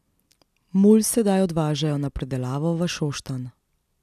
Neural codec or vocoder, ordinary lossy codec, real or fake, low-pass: none; none; real; 14.4 kHz